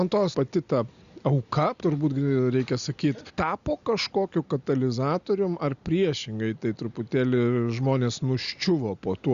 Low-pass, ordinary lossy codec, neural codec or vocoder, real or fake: 7.2 kHz; Opus, 64 kbps; none; real